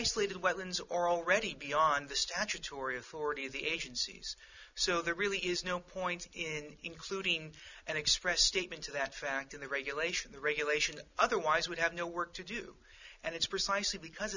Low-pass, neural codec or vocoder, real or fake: 7.2 kHz; none; real